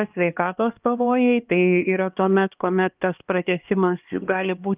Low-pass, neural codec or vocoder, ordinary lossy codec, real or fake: 3.6 kHz; codec, 16 kHz, 2 kbps, X-Codec, HuBERT features, trained on LibriSpeech; Opus, 24 kbps; fake